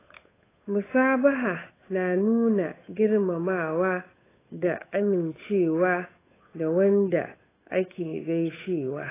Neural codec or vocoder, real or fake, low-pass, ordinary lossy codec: codec, 16 kHz, 8 kbps, FunCodec, trained on Chinese and English, 25 frames a second; fake; 3.6 kHz; AAC, 16 kbps